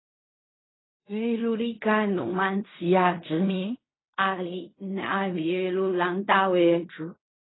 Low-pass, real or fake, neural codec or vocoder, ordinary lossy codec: 7.2 kHz; fake; codec, 16 kHz in and 24 kHz out, 0.4 kbps, LongCat-Audio-Codec, fine tuned four codebook decoder; AAC, 16 kbps